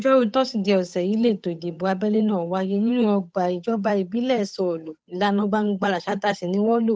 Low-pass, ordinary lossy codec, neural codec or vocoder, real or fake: none; none; codec, 16 kHz, 8 kbps, FunCodec, trained on Chinese and English, 25 frames a second; fake